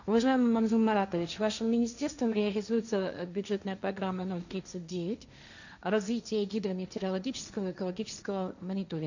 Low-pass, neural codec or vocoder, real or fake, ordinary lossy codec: 7.2 kHz; codec, 16 kHz, 1.1 kbps, Voila-Tokenizer; fake; none